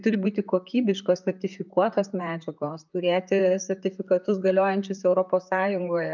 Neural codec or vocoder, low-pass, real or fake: vocoder, 44.1 kHz, 128 mel bands, Pupu-Vocoder; 7.2 kHz; fake